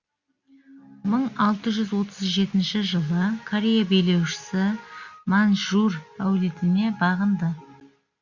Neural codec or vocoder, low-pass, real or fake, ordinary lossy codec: none; 7.2 kHz; real; Opus, 64 kbps